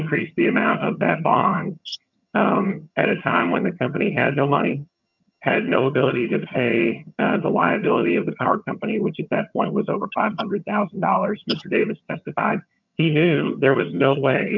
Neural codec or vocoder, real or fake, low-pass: vocoder, 22.05 kHz, 80 mel bands, HiFi-GAN; fake; 7.2 kHz